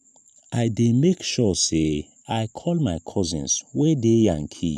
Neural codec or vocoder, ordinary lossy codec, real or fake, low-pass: vocoder, 44.1 kHz, 128 mel bands every 512 samples, BigVGAN v2; none; fake; 14.4 kHz